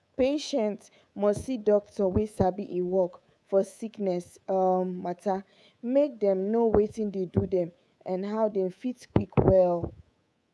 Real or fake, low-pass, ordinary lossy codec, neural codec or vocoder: fake; none; none; codec, 24 kHz, 3.1 kbps, DualCodec